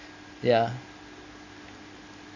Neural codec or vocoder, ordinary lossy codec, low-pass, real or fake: none; Opus, 64 kbps; 7.2 kHz; real